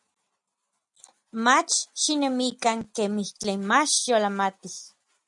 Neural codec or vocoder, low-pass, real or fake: none; 10.8 kHz; real